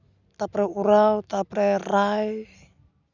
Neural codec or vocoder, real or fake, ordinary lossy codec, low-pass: none; real; none; 7.2 kHz